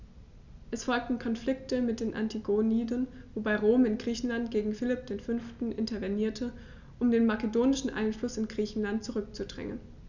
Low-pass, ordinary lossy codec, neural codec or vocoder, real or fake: 7.2 kHz; none; none; real